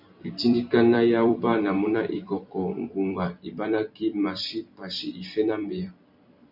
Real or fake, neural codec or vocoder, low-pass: fake; vocoder, 44.1 kHz, 128 mel bands every 256 samples, BigVGAN v2; 5.4 kHz